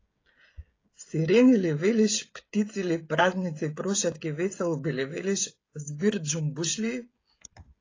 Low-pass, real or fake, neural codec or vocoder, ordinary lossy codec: 7.2 kHz; fake; codec, 16 kHz, 16 kbps, FreqCodec, smaller model; AAC, 32 kbps